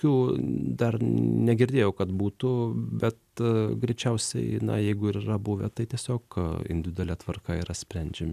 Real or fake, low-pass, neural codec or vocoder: real; 14.4 kHz; none